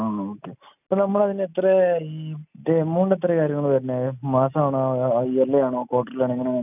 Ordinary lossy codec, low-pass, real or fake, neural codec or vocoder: none; 3.6 kHz; real; none